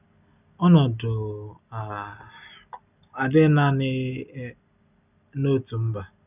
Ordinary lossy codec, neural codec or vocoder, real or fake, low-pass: none; none; real; 3.6 kHz